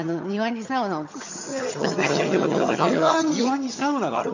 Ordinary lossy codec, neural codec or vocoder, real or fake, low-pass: none; vocoder, 22.05 kHz, 80 mel bands, HiFi-GAN; fake; 7.2 kHz